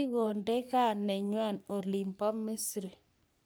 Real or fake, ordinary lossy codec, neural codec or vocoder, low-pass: fake; none; codec, 44.1 kHz, 3.4 kbps, Pupu-Codec; none